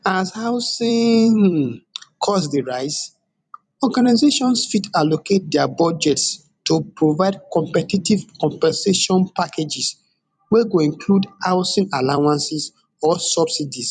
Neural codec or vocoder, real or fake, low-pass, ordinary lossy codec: vocoder, 44.1 kHz, 128 mel bands every 256 samples, BigVGAN v2; fake; 10.8 kHz; none